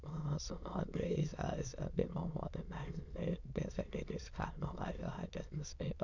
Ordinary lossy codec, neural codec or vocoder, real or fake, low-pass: none; autoencoder, 22.05 kHz, a latent of 192 numbers a frame, VITS, trained on many speakers; fake; 7.2 kHz